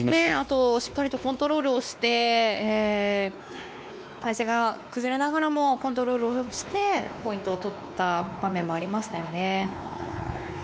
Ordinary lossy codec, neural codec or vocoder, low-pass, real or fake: none; codec, 16 kHz, 2 kbps, X-Codec, WavLM features, trained on Multilingual LibriSpeech; none; fake